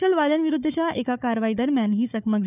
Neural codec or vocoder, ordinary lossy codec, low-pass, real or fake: codec, 16 kHz, 4 kbps, FunCodec, trained on Chinese and English, 50 frames a second; none; 3.6 kHz; fake